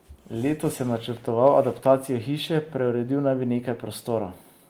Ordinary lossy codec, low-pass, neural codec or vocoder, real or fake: Opus, 24 kbps; 19.8 kHz; none; real